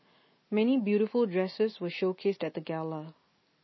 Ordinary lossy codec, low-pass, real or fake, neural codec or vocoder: MP3, 24 kbps; 7.2 kHz; real; none